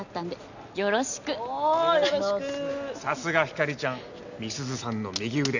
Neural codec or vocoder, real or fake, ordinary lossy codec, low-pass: none; real; none; 7.2 kHz